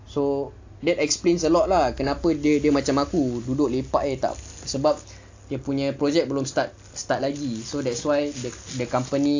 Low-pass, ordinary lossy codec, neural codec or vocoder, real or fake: 7.2 kHz; AAC, 48 kbps; none; real